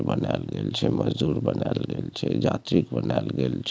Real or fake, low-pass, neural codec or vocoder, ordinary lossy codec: real; none; none; none